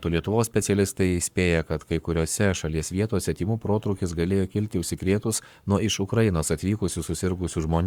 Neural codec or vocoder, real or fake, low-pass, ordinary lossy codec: codec, 44.1 kHz, 7.8 kbps, Pupu-Codec; fake; 19.8 kHz; Opus, 64 kbps